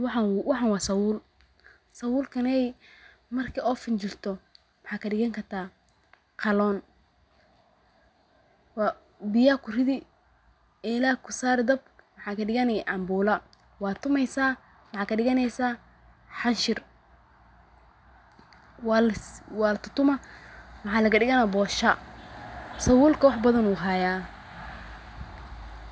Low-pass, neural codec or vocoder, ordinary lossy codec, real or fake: none; none; none; real